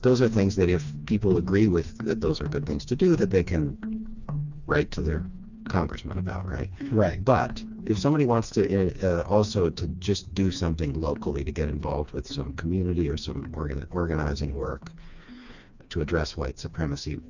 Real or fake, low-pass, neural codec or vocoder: fake; 7.2 kHz; codec, 16 kHz, 2 kbps, FreqCodec, smaller model